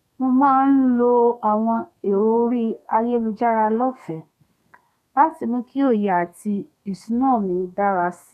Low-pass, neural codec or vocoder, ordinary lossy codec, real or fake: 14.4 kHz; codec, 32 kHz, 1.9 kbps, SNAC; none; fake